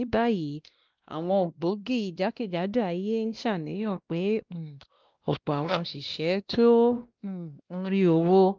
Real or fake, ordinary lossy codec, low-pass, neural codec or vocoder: fake; Opus, 32 kbps; 7.2 kHz; codec, 16 kHz, 1 kbps, X-Codec, WavLM features, trained on Multilingual LibriSpeech